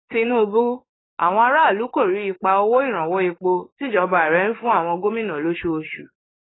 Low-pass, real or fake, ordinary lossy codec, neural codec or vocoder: 7.2 kHz; fake; AAC, 16 kbps; codec, 44.1 kHz, 7.8 kbps, DAC